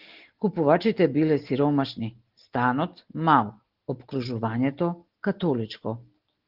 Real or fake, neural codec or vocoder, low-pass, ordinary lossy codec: real; none; 5.4 kHz; Opus, 16 kbps